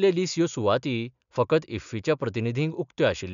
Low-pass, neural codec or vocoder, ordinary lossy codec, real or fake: 7.2 kHz; none; none; real